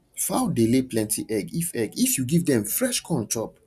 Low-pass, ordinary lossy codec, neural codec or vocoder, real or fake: 14.4 kHz; none; none; real